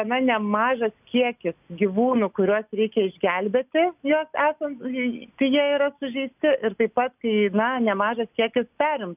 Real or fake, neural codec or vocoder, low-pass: real; none; 3.6 kHz